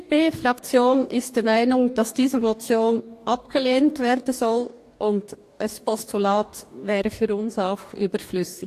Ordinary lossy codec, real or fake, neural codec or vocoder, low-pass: AAC, 64 kbps; fake; codec, 44.1 kHz, 2.6 kbps, DAC; 14.4 kHz